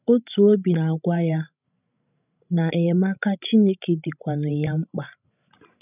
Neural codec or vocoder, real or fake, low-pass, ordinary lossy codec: codec, 16 kHz, 16 kbps, FreqCodec, larger model; fake; 3.6 kHz; none